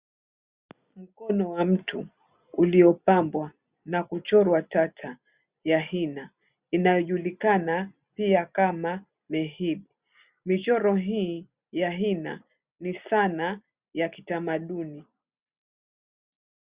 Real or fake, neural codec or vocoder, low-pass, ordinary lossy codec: real; none; 3.6 kHz; Opus, 64 kbps